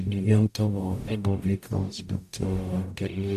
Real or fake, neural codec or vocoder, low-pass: fake; codec, 44.1 kHz, 0.9 kbps, DAC; 14.4 kHz